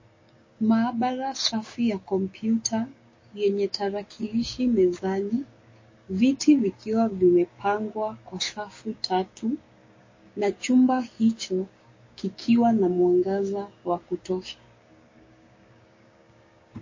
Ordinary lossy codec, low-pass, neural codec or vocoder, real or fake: MP3, 32 kbps; 7.2 kHz; codec, 16 kHz, 6 kbps, DAC; fake